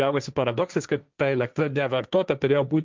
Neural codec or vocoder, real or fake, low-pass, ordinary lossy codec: codec, 16 kHz, 1.1 kbps, Voila-Tokenizer; fake; 7.2 kHz; Opus, 24 kbps